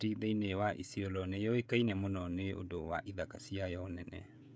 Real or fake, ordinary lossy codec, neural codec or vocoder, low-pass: fake; none; codec, 16 kHz, 16 kbps, FreqCodec, smaller model; none